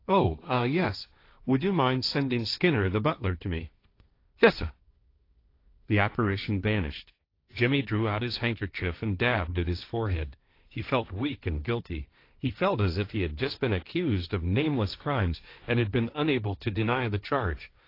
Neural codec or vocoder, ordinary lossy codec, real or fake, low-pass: codec, 16 kHz, 1.1 kbps, Voila-Tokenizer; AAC, 32 kbps; fake; 5.4 kHz